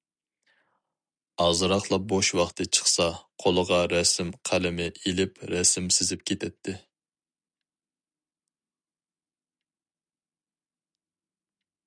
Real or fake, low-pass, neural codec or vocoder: real; 9.9 kHz; none